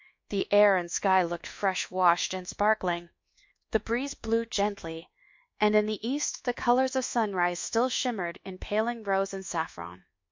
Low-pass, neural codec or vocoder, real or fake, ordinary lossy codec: 7.2 kHz; codec, 24 kHz, 1.2 kbps, DualCodec; fake; MP3, 48 kbps